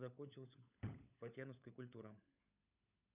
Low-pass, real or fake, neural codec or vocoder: 3.6 kHz; fake; codec, 16 kHz, 16 kbps, FunCodec, trained on LibriTTS, 50 frames a second